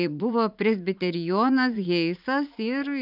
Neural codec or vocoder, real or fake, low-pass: vocoder, 44.1 kHz, 80 mel bands, Vocos; fake; 5.4 kHz